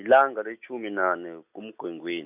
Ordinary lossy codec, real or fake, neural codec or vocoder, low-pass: none; real; none; 3.6 kHz